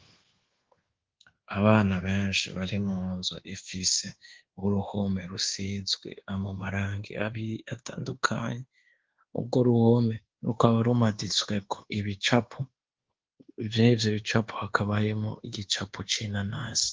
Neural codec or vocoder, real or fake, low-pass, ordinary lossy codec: codec, 24 kHz, 1.2 kbps, DualCodec; fake; 7.2 kHz; Opus, 16 kbps